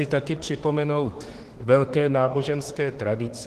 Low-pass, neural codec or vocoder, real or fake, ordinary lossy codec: 14.4 kHz; autoencoder, 48 kHz, 32 numbers a frame, DAC-VAE, trained on Japanese speech; fake; Opus, 16 kbps